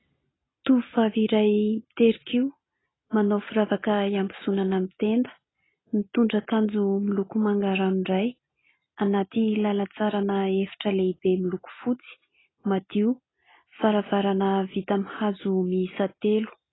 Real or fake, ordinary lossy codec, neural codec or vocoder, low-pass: real; AAC, 16 kbps; none; 7.2 kHz